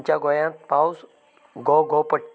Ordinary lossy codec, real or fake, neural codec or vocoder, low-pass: none; real; none; none